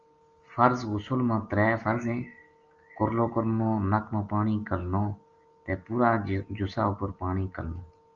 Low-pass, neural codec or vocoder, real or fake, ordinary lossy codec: 7.2 kHz; none; real; Opus, 32 kbps